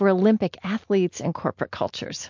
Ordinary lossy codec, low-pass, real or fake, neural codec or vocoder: MP3, 48 kbps; 7.2 kHz; real; none